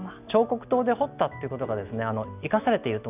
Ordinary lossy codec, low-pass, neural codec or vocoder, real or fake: none; 3.6 kHz; none; real